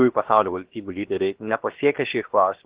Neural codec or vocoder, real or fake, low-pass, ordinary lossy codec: codec, 16 kHz, 0.7 kbps, FocalCodec; fake; 3.6 kHz; Opus, 16 kbps